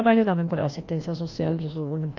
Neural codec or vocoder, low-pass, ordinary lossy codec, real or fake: codec, 16 kHz, 1 kbps, FreqCodec, larger model; 7.2 kHz; AAC, 48 kbps; fake